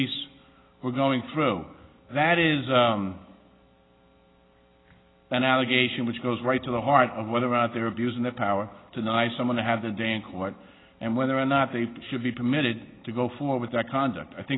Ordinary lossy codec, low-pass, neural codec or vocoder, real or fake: AAC, 16 kbps; 7.2 kHz; none; real